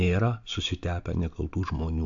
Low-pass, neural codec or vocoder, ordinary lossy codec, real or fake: 7.2 kHz; none; AAC, 64 kbps; real